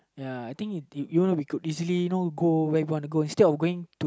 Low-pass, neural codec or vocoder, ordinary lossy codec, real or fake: none; none; none; real